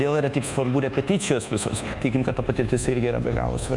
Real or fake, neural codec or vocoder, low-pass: fake; codec, 24 kHz, 1.2 kbps, DualCodec; 10.8 kHz